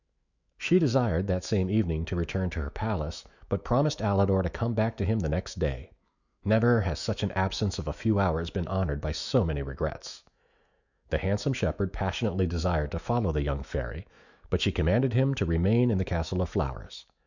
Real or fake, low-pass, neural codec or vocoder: fake; 7.2 kHz; autoencoder, 48 kHz, 128 numbers a frame, DAC-VAE, trained on Japanese speech